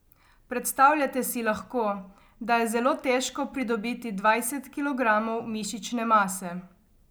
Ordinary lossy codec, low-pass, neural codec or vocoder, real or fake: none; none; none; real